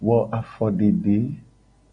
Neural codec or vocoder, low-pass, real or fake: none; 9.9 kHz; real